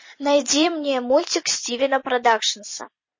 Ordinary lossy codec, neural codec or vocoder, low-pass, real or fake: MP3, 32 kbps; vocoder, 44.1 kHz, 128 mel bands every 256 samples, BigVGAN v2; 7.2 kHz; fake